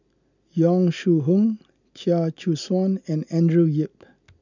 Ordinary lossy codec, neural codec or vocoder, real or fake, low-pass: none; none; real; 7.2 kHz